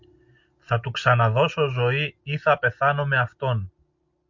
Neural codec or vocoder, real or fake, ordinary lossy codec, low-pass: none; real; MP3, 48 kbps; 7.2 kHz